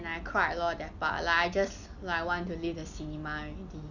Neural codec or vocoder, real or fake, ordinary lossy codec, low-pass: none; real; none; 7.2 kHz